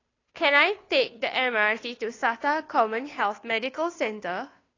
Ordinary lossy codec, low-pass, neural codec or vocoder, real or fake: AAC, 32 kbps; 7.2 kHz; codec, 16 kHz, 2 kbps, FunCodec, trained on Chinese and English, 25 frames a second; fake